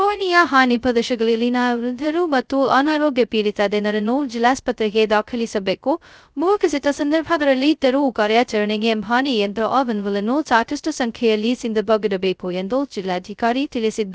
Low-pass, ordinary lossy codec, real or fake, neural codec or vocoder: none; none; fake; codec, 16 kHz, 0.2 kbps, FocalCodec